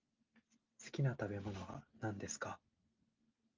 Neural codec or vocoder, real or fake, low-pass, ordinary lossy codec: none; real; 7.2 kHz; Opus, 24 kbps